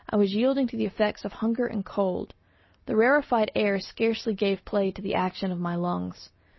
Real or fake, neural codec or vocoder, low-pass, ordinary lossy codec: real; none; 7.2 kHz; MP3, 24 kbps